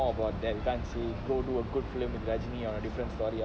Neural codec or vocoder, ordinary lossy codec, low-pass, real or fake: none; none; none; real